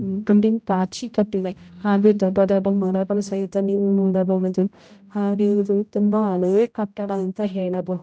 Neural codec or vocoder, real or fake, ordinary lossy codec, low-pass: codec, 16 kHz, 0.5 kbps, X-Codec, HuBERT features, trained on general audio; fake; none; none